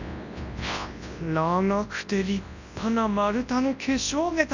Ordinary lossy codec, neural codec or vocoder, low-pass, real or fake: none; codec, 24 kHz, 0.9 kbps, WavTokenizer, large speech release; 7.2 kHz; fake